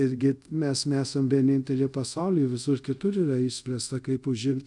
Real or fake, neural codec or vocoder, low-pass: fake; codec, 24 kHz, 0.5 kbps, DualCodec; 10.8 kHz